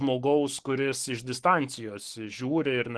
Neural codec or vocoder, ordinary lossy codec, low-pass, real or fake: codec, 44.1 kHz, 7.8 kbps, Pupu-Codec; Opus, 16 kbps; 10.8 kHz; fake